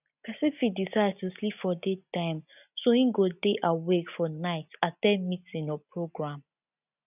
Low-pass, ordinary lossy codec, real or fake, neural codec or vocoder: 3.6 kHz; none; real; none